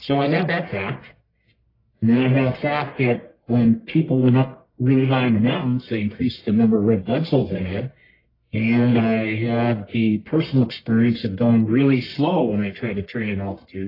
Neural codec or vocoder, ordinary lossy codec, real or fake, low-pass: codec, 44.1 kHz, 1.7 kbps, Pupu-Codec; AAC, 24 kbps; fake; 5.4 kHz